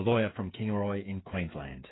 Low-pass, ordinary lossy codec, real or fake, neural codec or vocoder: 7.2 kHz; AAC, 16 kbps; fake; codec, 16 kHz, 8 kbps, FreqCodec, smaller model